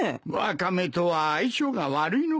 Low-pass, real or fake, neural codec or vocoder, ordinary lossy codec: none; real; none; none